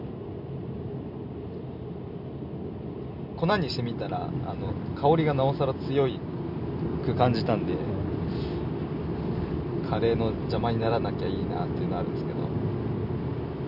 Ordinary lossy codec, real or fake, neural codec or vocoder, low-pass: none; real; none; 5.4 kHz